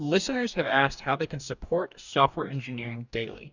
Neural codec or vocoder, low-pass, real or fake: codec, 44.1 kHz, 2.6 kbps, DAC; 7.2 kHz; fake